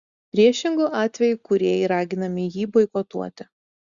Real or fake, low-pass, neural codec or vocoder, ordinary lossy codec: real; 7.2 kHz; none; Opus, 64 kbps